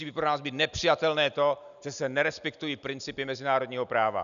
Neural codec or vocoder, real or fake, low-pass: none; real; 7.2 kHz